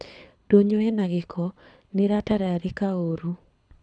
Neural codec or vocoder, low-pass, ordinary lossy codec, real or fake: codec, 24 kHz, 6 kbps, HILCodec; 9.9 kHz; none; fake